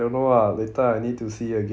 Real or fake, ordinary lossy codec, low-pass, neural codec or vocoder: real; none; none; none